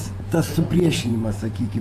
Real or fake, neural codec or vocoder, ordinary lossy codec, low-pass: fake; vocoder, 48 kHz, 128 mel bands, Vocos; AAC, 64 kbps; 14.4 kHz